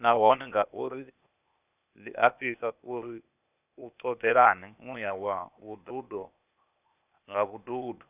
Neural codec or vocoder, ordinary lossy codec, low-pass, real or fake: codec, 16 kHz, 0.8 kbps, ZipCodec; none; 3.6 kHz; fake